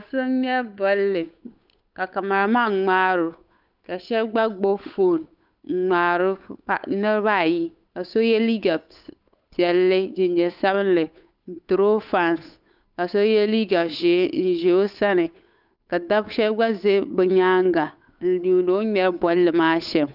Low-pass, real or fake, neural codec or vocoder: 5.4 kHz; fake; codec, 16 kHz, 8 kbps, FunCodec, trained on Chinese and English, 25 frames a second